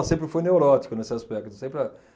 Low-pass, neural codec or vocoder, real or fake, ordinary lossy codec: none; none; real; none